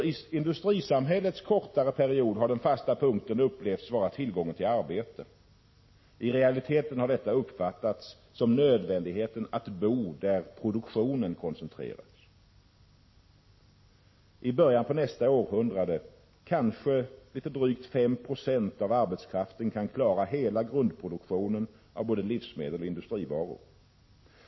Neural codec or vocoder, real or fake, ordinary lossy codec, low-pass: none; real; MP3, 24 kbps; 7.2 kHz